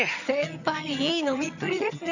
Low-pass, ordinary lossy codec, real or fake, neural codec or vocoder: 7.2 kHz; none; fake; vocoder, 22.05 kHz, 80 mel bands, HiFi-GAN